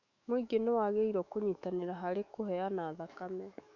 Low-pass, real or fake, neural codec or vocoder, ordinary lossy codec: 7.2 kHz; fake; autoencoder, 48 kHz, 128 numbers a frame, DAC-VAE, trained on Japanese speech; none